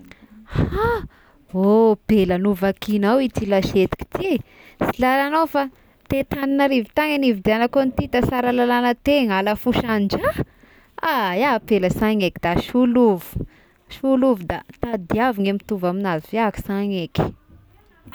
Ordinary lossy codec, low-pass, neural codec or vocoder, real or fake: none; none; none; real